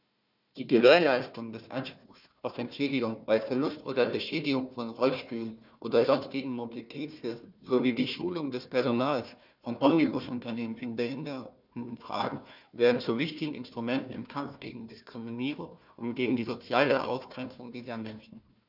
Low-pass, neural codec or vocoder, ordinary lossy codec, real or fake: 5.4 kHz; codec, 16 kHz, 1 kbps, FunCodec, trained on Chinese and English, 50 frames a second; none; fake